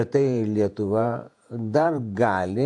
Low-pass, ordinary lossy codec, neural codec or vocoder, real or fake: 10.8 kHz; AAC, 64 kbps; vocoder, 48 kHz, 128 mel bands, Vocos; fake